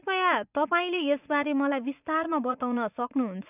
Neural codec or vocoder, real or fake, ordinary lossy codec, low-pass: vocoder, 24 kHz, 100 mel bands, Vocos; fake; none; 3.6 kHz